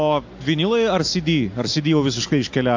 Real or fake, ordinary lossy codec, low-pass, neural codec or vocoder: real; AAC, 48 kbps; 7.2 kHz; none